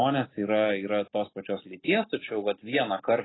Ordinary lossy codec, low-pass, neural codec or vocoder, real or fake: AAC, 16 kbps; 7.2 kHz; none; real